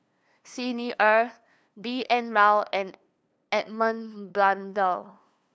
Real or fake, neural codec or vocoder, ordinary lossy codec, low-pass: fake; codec, 16 kHz, 2 kbps, FunCodec, trained on LibriTTS, 25 frames a second; none; none